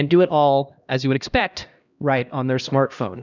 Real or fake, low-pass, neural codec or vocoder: fake; 7.2 kHz; codec, 16 kHz, 1 kbps, X-Codec, HuBERT features, trained on LibriSpeech